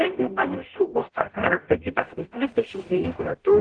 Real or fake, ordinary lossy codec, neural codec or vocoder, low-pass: fake; Opus, 16 kbps; codec, 44.1 kHz, 0.9 kbps, DAC; 9.9 kHz